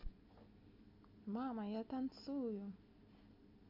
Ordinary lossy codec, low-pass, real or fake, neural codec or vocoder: MP3, 48 kbps; 5.4 kHz; real; none